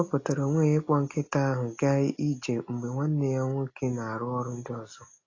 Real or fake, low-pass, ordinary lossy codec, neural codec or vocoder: real; 7.2 kHz; AAC, 32 kbps; none